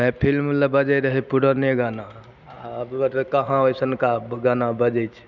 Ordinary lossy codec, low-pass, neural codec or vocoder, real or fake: none; 7.2 kHz; none; real